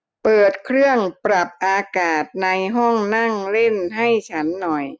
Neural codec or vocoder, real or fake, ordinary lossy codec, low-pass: none; real; none; none